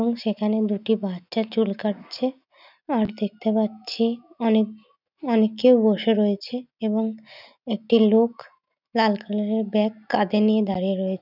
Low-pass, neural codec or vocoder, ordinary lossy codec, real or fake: 5.4 kHz; none; none; real